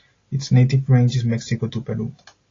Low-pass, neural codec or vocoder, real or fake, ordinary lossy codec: 7.2 kHz; none; real; AAC, 32 kbps